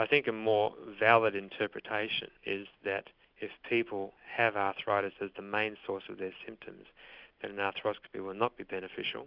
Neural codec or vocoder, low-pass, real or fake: none; 5.4 kHz; real